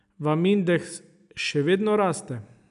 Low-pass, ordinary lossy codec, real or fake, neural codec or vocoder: 10.8 kHz; none; real; none